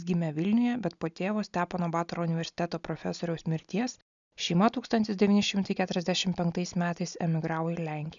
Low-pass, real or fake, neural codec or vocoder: 7.2 kHz; real; none